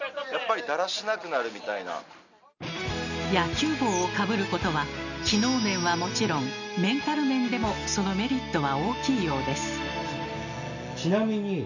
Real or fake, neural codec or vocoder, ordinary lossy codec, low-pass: real; none; none; 7.2 kHz